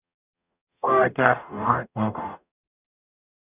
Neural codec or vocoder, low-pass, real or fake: codec, 44.1 kHz, 0.9 kbps, DAC; 3.6 kHz; fake